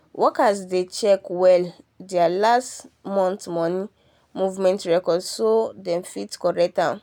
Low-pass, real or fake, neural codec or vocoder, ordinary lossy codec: 19.8 kHz; real; none; none